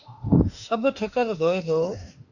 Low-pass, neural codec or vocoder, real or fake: 7.2 kHz; autoencoder, 48 kHz, 32 numbers a frame, DAC-VAE, trained on Japanese speech; fake